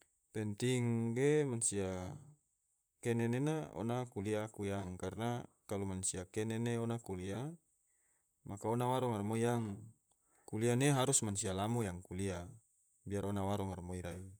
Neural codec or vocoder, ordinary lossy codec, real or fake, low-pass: vocoder, 44.1 kHz, 128 mel bands, Pupu-Vocoder; none; fake; none